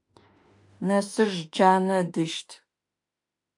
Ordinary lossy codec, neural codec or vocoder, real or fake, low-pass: AAC, 64 kbps; autoencoder, 48 kHz, 32 numbers a frame, DAC-VAE, trained on Japanese speech; fake; 10.8 kHz